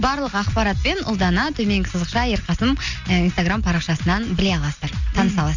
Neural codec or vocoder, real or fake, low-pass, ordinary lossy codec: none; real; 7.2 kHz; none